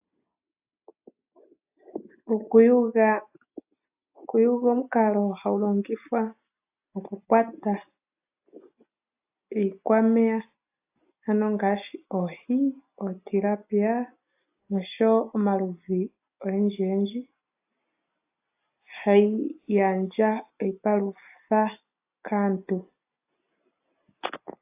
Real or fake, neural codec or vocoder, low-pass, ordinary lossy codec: real; none; 3.6 kHz; AAC, 32 kbps